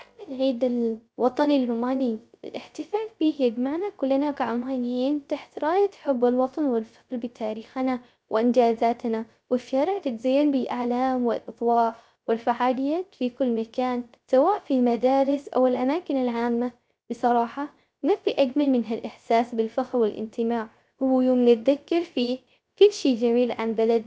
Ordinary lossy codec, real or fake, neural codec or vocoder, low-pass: none; fake; codec, 16 kHz, 0.3 kbps, FocalCodec; none